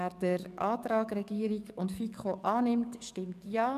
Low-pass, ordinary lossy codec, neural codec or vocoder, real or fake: 14.4 kHz; none; codec, 44.1 kHz, 7.8 kbps, DAC; fake